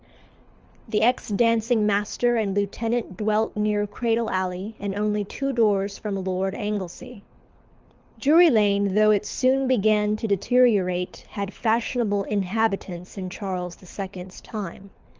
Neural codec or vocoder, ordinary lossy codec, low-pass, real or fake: codec, 24 kHz, 6 kbps, HILCodec; Opus, 24 kbps; 7.2 kHz; fake